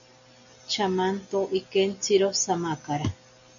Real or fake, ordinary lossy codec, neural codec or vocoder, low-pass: real; MP3, 96 kbps; none; 7.2 kHz